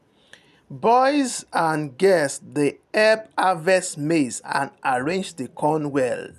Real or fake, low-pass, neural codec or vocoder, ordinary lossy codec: real; 14.4 kHz; none; none